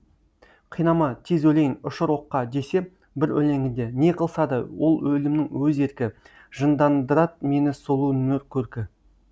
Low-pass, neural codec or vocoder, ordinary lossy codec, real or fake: none; none; none; real